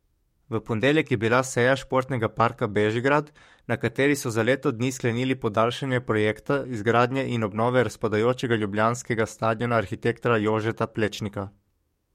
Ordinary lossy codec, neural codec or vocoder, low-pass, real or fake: MP3, 64 kbps; codec, 44.1 kHz, 7.8 kbps, DAC; 19.8 kHz; fake